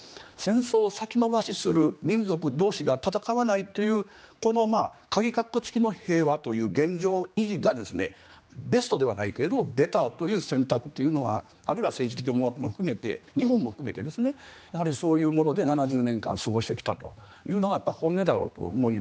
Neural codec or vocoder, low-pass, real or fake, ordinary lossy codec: codec, 16 kHz, 2 kbps, X-Codec, HuBERT features, trained on general audio; none; fake; none